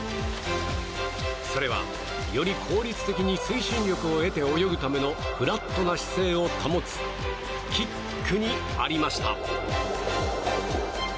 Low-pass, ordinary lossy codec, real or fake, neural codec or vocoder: none; none; real; none